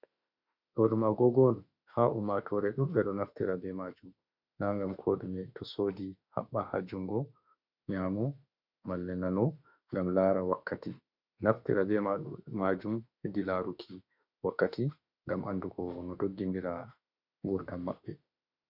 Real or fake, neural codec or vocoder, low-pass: fake; autoencoder, 48 kHz, 32 numbers a frame, DAC-VAE, trained on Japanese speech; 5.4 kHz